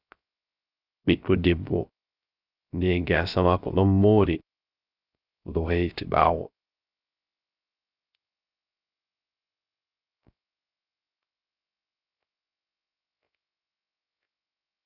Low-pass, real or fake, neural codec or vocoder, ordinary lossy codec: 5.4 kHz; fake; codec, 16 kHz, 0.3 kbps, FocalCodec; Opus, 64 kbps